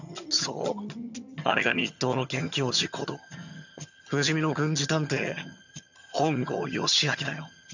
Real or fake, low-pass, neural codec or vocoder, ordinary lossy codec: fake; 7.2 kHz; vocoder, 22.05 kHz, 80 mel bands, HiFi-GAN; none